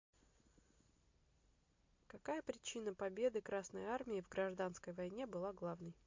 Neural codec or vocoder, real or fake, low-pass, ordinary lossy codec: none; real; 7.2 kHz; MP3, 48 kbps